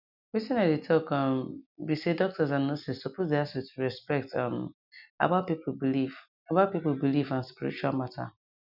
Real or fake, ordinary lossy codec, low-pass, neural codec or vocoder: real; none; 5.4 kHz; none